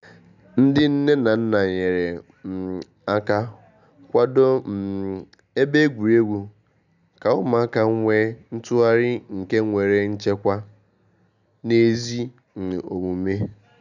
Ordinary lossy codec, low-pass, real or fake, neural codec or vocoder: none; 7.2 kHz; real; none